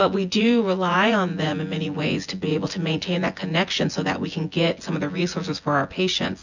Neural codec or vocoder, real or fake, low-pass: vocoder, 24 kHz, 100 mel bands, Vocos; fake; 7.2 kHz